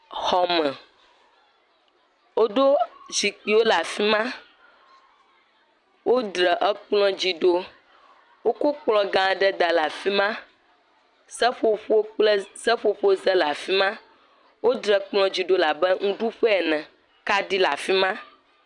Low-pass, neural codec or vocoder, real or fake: 10.8 kHz; vocoder, 44.1 kHz, 128 mel bands every 256 samples, BigVGAN v2; fake